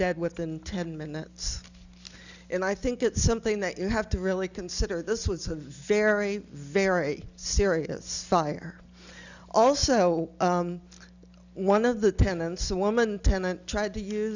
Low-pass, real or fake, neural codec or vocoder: 7.2 kHz; real; none